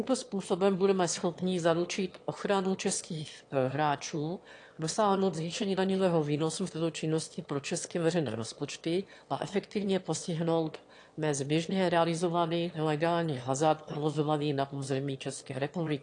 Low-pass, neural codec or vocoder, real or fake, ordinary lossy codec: 9.9 kHz; autoencoder, 22.05 kHz, a latent of 192 numbers a frame, VITS, trained on one speaker; fake; AAC, 48 kbps